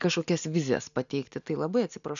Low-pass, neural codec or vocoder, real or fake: 7.2 kHz; none; real